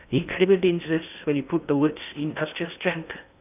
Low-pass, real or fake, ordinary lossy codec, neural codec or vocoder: 3.6 kHz; fake; none; codec, 16 kHz in and 24 kHz out, 0.8 kbps, FocalCodec, streaming, 65536 codes